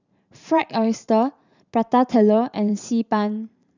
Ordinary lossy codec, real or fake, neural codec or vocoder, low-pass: none; fake; vocoder, 44.1 kHz, 128 mel bands every 512 samples, BigVGAN v2; 7.2 kHz